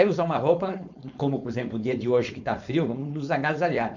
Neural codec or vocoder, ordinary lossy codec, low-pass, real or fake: codec, 16 kHz, 4.8 kbps, FACodec; none; 7.2 kHz; fake